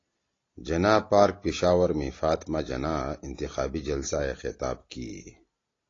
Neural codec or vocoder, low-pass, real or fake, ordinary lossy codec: none; 7.2 kHz; real; AAC, 32 kbps